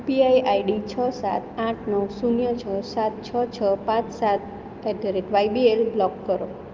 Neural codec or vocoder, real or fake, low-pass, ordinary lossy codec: none; real; 7.2 kHz; Opus, 32 kbps